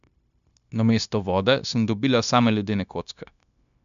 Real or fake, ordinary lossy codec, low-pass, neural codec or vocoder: fake; none; 7.2 kHz; codec, 16 kHz, 0.9 kbps, LongCat-Audio-Codec